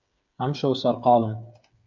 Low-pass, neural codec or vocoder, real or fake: 7.2 kHz; codec, 16 kHz, 8 kbps, FreqCodec, smaller model; fake